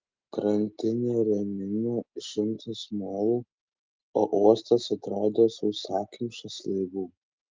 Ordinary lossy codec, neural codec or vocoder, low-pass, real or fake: Opus, 24 kbps; none; 7.2 kHz; real